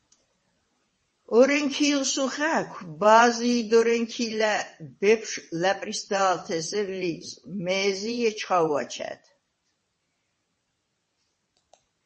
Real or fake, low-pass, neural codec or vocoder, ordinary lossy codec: fake; 9.9 kHz; vocoder, 22.05 kHz, 80 mel bands, WaveNeXt; MP3, 32 kbps